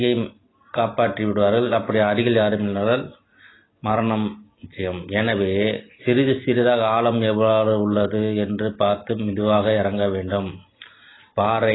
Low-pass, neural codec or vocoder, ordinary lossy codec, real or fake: 7.2 kHz; none; AAC, 16 kbps; real